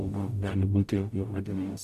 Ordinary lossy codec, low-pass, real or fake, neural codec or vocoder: AAC, 96 kbps; 14.4 kHz; fake; codec, 44.1 kHz, 0.9 kbps, DAC